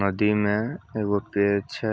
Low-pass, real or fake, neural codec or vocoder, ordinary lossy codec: none; real; none; none